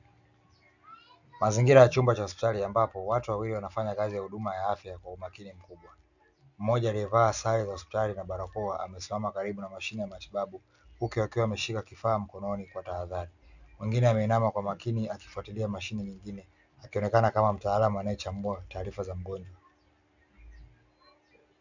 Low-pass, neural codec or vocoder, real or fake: 7.2 kHz; none; real